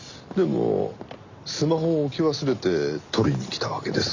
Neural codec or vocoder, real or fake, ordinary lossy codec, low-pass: none; real; Opus, 64 kbps; 7.2 kHz